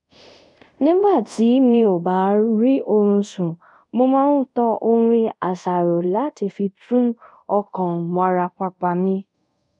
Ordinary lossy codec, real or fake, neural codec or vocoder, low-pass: none; fake; codec, 24 kHz, 0.5 kbps, DualCodec; 10.8 kHz